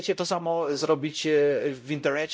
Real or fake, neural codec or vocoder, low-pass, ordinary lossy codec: fake; codec, 16 kHz, 0.5 kbps, X-Codec, WavLM features, trained on Multilingual LibriSpeech; none; none